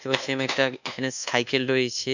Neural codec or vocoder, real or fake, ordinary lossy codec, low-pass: codec, 24 kHz, 1.2 kbps, DualCodec; fake; none; 7.2 kHz